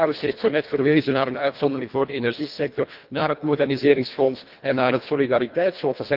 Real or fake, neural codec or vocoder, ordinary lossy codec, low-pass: fake; codec, 24 kHz, 1.5 kbps, HILCodec; Opus, 32 kbps; 5.4 kHz